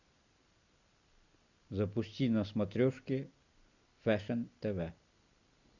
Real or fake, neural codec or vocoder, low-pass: real; none; 7.2 kHz